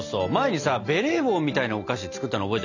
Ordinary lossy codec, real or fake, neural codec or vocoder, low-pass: none; real; none; 7.2 kHz